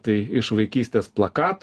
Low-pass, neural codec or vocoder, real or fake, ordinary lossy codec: 10.8 kHz; none; real; Opus, 16 kbps